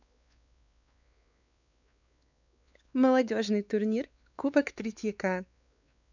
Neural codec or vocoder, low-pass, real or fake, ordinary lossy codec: codec, 16 kHz, 4 kbps, X-Codec, WavLM features, trained on Multilingual LibriSpeech; 7.2 kHz; fake; none